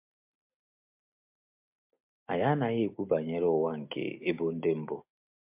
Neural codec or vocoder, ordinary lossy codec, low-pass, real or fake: none; MP3, 32 kbps; 3.6 kHz; real